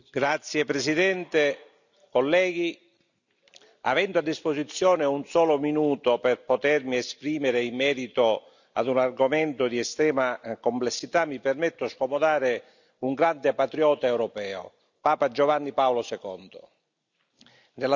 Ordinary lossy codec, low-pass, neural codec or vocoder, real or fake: none; 7.2 kHz; none; real